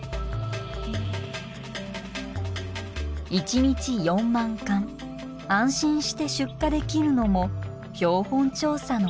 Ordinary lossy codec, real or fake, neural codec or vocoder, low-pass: none; real; none; none